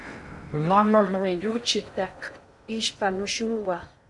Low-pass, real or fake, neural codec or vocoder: 10.8 kHz; fake; codec, 16 kHz in and 24 kHz out, 0.6 kbps, FocalCodec, streaming, 2048 codes